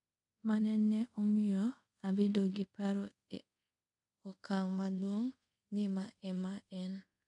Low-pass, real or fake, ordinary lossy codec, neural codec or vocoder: 10.8 kHz; fake; none; codec, 24 kHz, 0.5 kbps, DualCodec